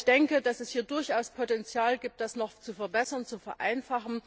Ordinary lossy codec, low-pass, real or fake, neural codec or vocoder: none; none; real; none